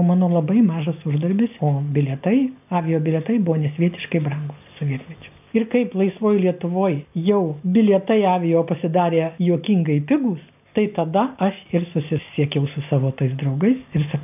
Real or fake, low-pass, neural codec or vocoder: real; 3.6 kHz; none